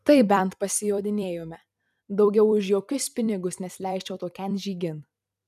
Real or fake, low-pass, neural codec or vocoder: fake; 14.4 kHz; vocoder, 44.1 kHz, 128 mel bands every 256 samples, BigVGAN v2